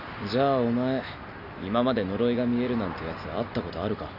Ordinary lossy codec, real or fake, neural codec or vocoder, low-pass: Opus, 64 kbps; real; none; 5.4 kHz